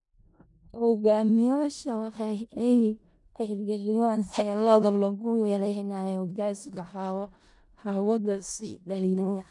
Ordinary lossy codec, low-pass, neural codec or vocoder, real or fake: none; 10.8 kHz; codec, 16 kHz in and 24 kHz out, 0.4 kbps, LongCat-Audio-Codec, four codebook decoder; fake